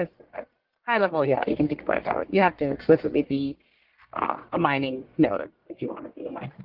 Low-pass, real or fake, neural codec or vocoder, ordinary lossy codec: 5.4 kHz; fake; codec, 44.1 kHz, 1.7 kbps, Pupu-Codec; Opus, 16 kbps